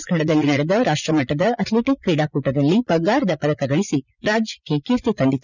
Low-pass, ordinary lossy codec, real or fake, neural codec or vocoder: 7.2 kHz; none; fake; vocoder, 44.1 kHz, 80 mel bands, Vocos